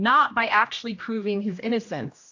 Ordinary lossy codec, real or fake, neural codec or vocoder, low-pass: AAC, 48 kbps; fake; codec, 16 kHz, 1 kbps, X-Codec, HuBERT features, trained on general audio; 7.2 kHz